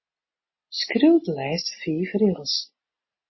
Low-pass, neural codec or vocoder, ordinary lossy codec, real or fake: 7.2 kHz; none; MP3, 24 kbps; real